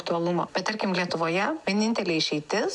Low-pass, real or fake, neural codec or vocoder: 10.8 kHz; real; none